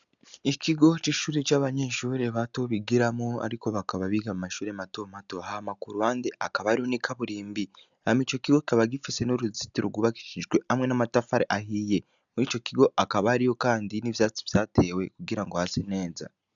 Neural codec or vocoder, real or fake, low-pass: none; real; 7.2 kHz